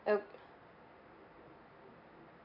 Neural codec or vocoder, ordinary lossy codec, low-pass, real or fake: none; none; 5.4 kHz; real